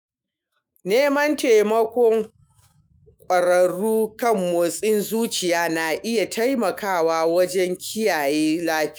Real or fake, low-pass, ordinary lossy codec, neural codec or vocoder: fake; none; none; autoencoder, 48 kHz, 128 numbers a frame, DAC-VAE, trained on Japanese speech